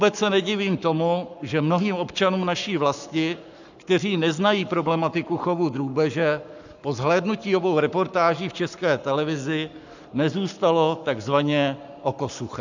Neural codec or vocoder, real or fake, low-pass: codec, 44.1 kHz, 7.8 kbps, Pupu-Codec; fake; 7.2 kHz